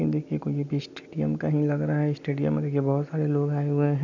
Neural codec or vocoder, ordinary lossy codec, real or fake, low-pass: none; none; real; 7.2 kHz